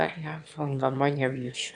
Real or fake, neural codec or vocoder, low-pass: fake; autoencoder, 22.05 kHz, a latent of 192 numbers a frame, VITS, trained on one speaker; 9.9 kHz